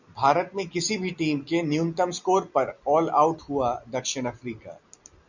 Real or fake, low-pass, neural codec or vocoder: real; 7.2 kHz; none